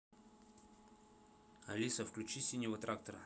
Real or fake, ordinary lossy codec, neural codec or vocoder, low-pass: real; none; none; none